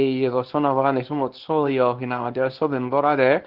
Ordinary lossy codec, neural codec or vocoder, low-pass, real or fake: Opus, 16 kbps; codec, 24 kHz, 0.9 kbps, WavTokenizer, small release; 5.4 kHz; fake